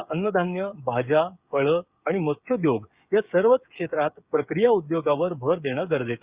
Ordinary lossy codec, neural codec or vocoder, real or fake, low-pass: Opus, 64 kbps; codec, 16 kHz, 8 kbps, FreqCodec, smaller model; fake; 3.6 kHz